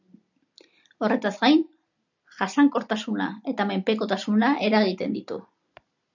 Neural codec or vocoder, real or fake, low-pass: none; real; 7.2 kHz